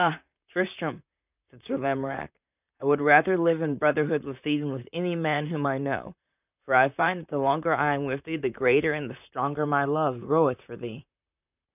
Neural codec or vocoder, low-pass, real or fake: vocoder, 44.1 kHz, 128 mel bands, Pupu-Vocoder; 3.6 kHz; fake